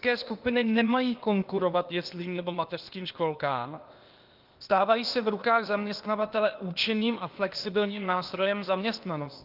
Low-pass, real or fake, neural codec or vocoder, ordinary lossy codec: 5.4 kHz; fake; codec, 16 kHz, 0.8 kbps, ZipCodec; Opus, 32 kbps